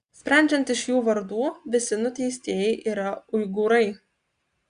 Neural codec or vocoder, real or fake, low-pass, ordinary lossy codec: none; real; 9.9 kHz; Opus, 64 kbps